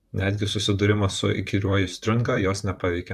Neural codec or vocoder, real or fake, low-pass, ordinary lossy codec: vocoder, 44.1 kHz, 128 mel bands, Pupu-Vocoder; fake; 14.4 kHz; AAC, 96 kbps